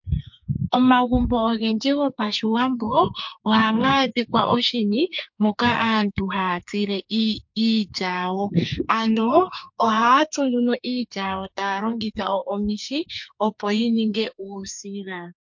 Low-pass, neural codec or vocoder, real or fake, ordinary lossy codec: 7.2 kHz; codec, 44.1 kHz, 2.6 kbps, SNAC; fake; MP3, 48 kbps